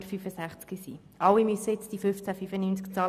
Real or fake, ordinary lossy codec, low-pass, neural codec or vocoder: real; none; 14.4 kHz; none